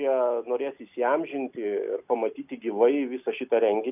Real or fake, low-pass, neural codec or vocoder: real; 3.6 kHz; none